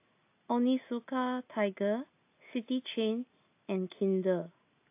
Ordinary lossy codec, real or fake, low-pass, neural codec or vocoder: AAC, 24 kbps; real; 3.6 kHz; none